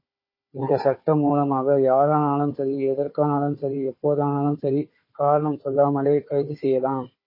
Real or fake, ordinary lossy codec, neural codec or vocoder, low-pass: fake; MP3, 24 kbps; codec, 16 kHz, 4 kbps, FunCodec, trained on Chinese and English, 50 frames a second; 5.4 kHz